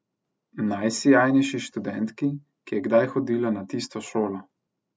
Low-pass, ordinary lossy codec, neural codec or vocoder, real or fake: none; none; none; real